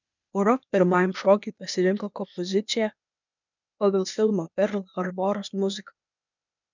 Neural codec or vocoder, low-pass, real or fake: codec, 16 kHz, 0.8 kbps, ZipCodec; 7.2 kHz; fake